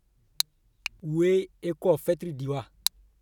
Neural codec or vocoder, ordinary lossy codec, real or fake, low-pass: none; none; real; 19.8 kHz